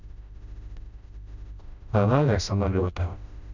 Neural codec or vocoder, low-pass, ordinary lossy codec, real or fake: codec, 16 kHz, 0.5 kbps, FreqCodec, smaller model; 7.2 kHz; none; fake